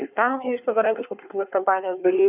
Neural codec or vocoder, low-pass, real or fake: codec, 24 kHz, 1 kbps, SNAC; 3.6 kHz; fake